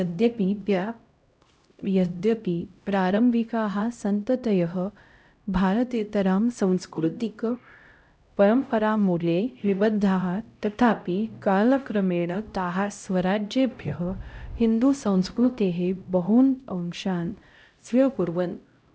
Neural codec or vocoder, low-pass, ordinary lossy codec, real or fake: codec, 16 kHz, 0.5 kbps, X-Codec, HuBERT features, trained on LibriSpeech; none; none; fake